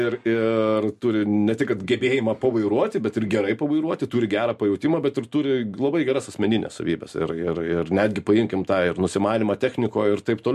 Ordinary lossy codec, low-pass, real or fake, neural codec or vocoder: MP3, 64 kbps; 14.4 kHz; real; none